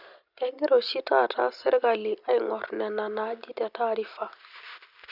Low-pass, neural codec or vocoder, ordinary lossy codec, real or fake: 5.4 kHz; none; Opus, 64 kbps; real